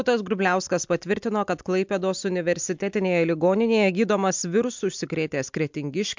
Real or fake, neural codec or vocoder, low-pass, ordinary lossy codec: real; none; 7.2 kHz; MP3, 64 kbps